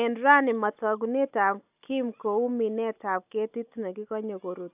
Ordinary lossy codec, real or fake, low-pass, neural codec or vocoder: AAC, 32 kbps; real; 3.6 kHz; none